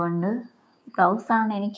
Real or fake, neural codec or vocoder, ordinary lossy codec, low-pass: fake; codec, 16 kHz, 6 kbps, DAC; none; none